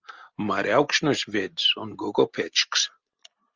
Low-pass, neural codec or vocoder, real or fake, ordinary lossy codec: 7.2 kHz; none; real; Opus, 32 kbps